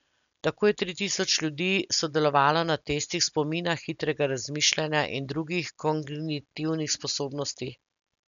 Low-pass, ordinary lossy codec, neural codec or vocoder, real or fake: 7.2 kHz; none; none; real